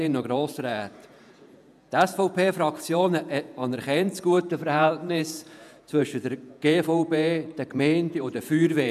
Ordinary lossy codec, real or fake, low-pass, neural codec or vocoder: none; fake; 14.4 kHz; vocoder, 48 kHz, 128 mel bands, Vocos